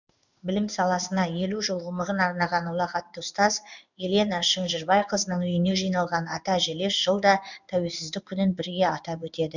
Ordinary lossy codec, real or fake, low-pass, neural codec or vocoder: none; fake; 7.2 kHz; codec, 16 kHz in and 24 kHz out, 1 kbps, XY-Tokenizer